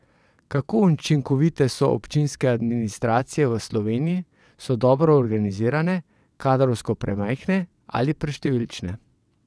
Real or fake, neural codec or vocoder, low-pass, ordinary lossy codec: fake; vocoder, 22.05 kHz, 80 mel bands, WaveNeXt; none; none